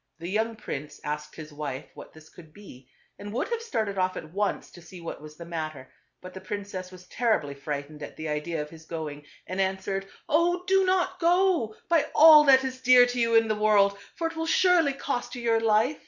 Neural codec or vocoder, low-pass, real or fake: none; 7.2 kHz; real